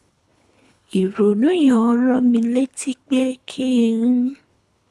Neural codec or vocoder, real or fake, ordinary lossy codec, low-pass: codec, 24 kHz, 3 kbps, HILCodec; fake; none; none